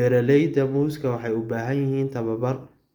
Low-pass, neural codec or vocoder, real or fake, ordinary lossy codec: 19.8 kHz; none; real; Opus, 32 kbps